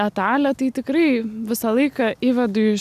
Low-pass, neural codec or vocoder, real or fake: 14.4 kHz; none; real